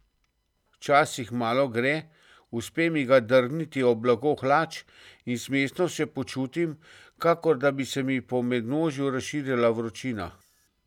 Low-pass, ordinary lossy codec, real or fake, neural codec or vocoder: 19.8 kHz; none; real; none